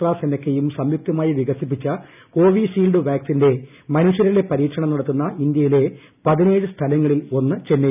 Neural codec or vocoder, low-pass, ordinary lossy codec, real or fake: none; 3.6 kHz; none; real